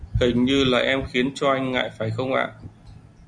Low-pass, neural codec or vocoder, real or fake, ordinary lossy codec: 9.9 kHz; none; real; MP3, 96 kbps